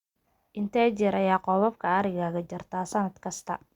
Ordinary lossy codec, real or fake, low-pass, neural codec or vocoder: Opus, 64 kbps; real; 19.8 kHz; none